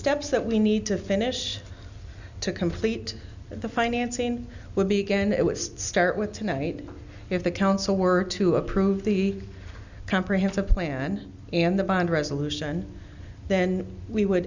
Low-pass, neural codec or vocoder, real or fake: 7.2 kHz; none; real